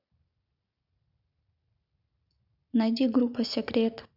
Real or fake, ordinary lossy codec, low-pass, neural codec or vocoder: real; none; 5.4 kHz; none